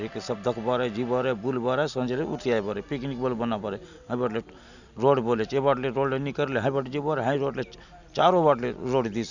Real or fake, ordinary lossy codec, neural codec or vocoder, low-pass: real; none; none; 7.2 kHz